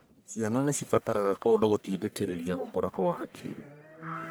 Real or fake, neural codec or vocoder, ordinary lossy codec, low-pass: fake; codec, 44.1 kHz, 1.7 kbps, Pupu-Codec; none; none